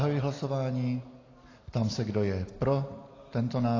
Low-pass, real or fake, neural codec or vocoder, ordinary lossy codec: 7.2 kHz; real; none; AAC, 32 kbps